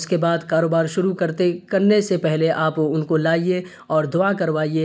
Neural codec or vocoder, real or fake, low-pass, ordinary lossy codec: none; real; none; none